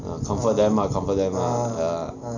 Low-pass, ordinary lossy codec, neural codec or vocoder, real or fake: 7.2 kHz; none; none; real